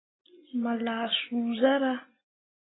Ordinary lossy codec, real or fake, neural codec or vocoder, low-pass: AAC, 16 kbps; real; none; 7.2 kHz